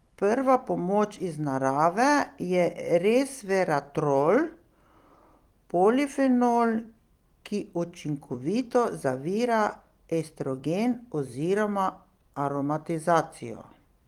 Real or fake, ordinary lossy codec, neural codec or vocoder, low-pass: fake; Opus, 24 kbps; vocoder, 44.1 kHz, 128 mel bands every 256 samples, BigVGAN v2; 19.8 kHz